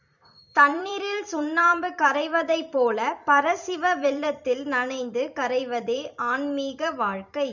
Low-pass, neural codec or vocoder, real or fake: 7.2 kHz; vocoder, 44.1 kHz, 128 mel bands every 256 samples, BigVGAN v2; fake